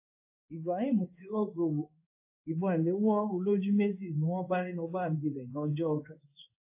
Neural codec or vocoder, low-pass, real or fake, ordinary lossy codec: codec, 16 kHz in and 24 kHz out, 1 kbps, XY-Tokenizer; 3.6 kHz; fake; AAC, 32 kbps